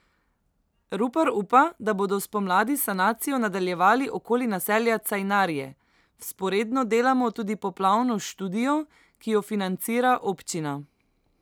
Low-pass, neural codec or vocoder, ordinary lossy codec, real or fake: none; none; none; real